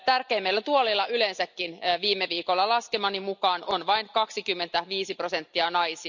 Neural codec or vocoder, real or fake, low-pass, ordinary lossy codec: none; real; 7.2 kHz; none